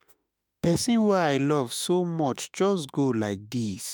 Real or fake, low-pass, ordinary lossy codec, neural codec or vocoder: fake; none; none; autoencoder, 48 kHz, 32 numbers a frame, DAC-VAE, trained on Japanese speech